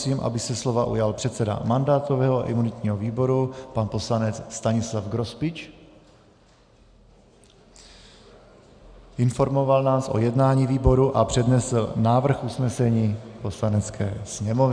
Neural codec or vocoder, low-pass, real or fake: none; 9.9 kHz; real